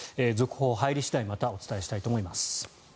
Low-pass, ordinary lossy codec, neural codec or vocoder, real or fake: none; none; none; real